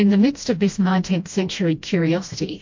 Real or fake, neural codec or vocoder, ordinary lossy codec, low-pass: fake; codec, 16 kHz, 1 kbps, FreqCodec, smaller model; MP3, 48 kbps; 7.2 kHz